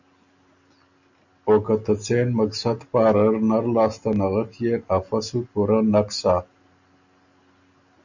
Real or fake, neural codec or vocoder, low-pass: real; none; 7.2 kHz